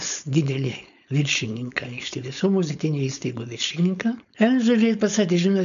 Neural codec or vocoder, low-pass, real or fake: codec, 16 kHz, 4.8 kbps, FACodec; 7.2 kHz; fake